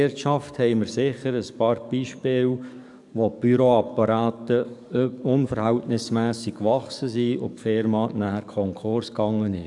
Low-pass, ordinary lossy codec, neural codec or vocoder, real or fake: 10.8 kHz; none; codec, 44.1 kHz, 7.8 kbps, DAC; fake